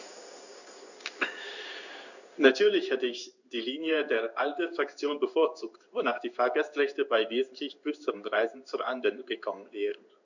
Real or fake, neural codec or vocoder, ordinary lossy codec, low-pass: fake; codec, 16 kHz in and 24 kHz out, 1 kbps, XY-Tokenizer; none; 7.2 kHz